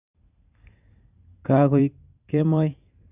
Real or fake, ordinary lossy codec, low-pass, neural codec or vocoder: fake; none; 3.6 kHz; vocoder, 44.1 kHz, 128 mel bands every 512 samples, BigVGAN v2